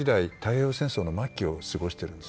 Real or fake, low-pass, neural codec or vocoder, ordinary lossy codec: real; none; none; none